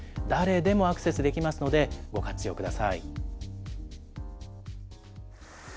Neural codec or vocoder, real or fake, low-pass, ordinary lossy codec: none; real; none; none